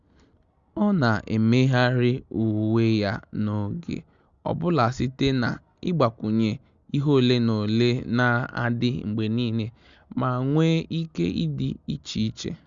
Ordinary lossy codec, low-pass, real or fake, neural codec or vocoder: Opus, 64 kbps; 7.2 kHz; real; none